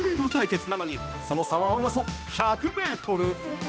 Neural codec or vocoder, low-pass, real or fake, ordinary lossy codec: codec, 16 kHz, 1 kbps, X-Codec, HuBERT features, trained on balanced general audio; none; fake; none